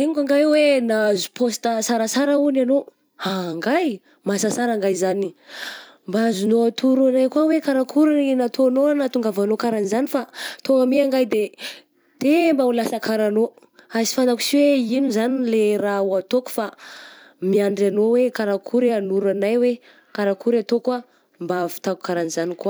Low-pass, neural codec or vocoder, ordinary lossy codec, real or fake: none; vocoder, 44.1 kHz, 128 mel bands every 256 samples, BigVGAN v2; none; fake